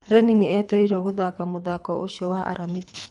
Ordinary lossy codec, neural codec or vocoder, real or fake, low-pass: none; codec, 24 kHz, 3 kbps, HILCodec; fake; 10.8 kHz